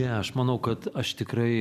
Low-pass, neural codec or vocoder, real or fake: 14.4 kHz; vocoder, 44.1 kHz, 128 mel bands every 256 samples, BigVGAN v2; fake